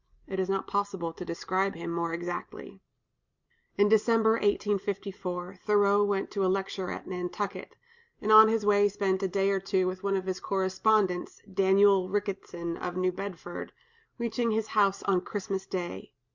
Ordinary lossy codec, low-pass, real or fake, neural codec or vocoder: Opus, 64 kbps; 7.2 kHz; real; none